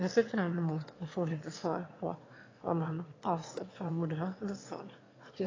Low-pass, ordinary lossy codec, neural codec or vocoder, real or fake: 7.2 kHz; AAC, 32 kbps; autoencoder, 22.05 kHz, a latent of 192 numbers a frame, VITS, trained on one speaker; fake